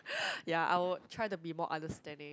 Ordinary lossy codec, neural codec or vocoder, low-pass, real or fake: none; none; none; real